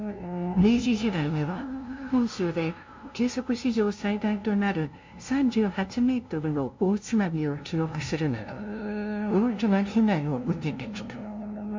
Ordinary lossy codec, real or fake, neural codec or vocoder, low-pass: MP3, 48 kbps; fake; codec, 16 kHz, 0.5 kbps, FunCodec, trained on LibriTTS, 25 frames a second; 7.2 kHz